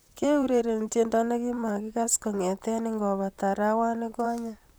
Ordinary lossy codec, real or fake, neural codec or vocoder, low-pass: none; fake; vocoder, 44.1 kHz, 128 mel bands, Pupu-Vocoder; none